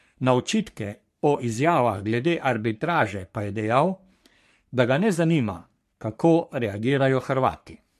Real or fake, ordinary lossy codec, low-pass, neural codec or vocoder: fake; MP3, 64 kbps; 14.4 kHz; codec, 44.1 kHz, 3.4 kbps, Pupu-Codec